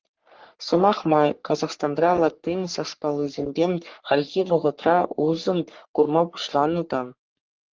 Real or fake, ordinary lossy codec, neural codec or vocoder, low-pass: fake; Opus, 32 kbps; codec, 44.1 kHz, 3.4 kbps, Pupu-Codec; 7.2 kHz